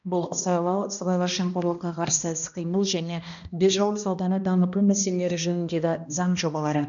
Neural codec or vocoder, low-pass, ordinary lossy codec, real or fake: codec, 16 kHz, 1 kbps, X-Codec, HuBERT features, trained on balanced general audio; 7.2 kHz; none; fake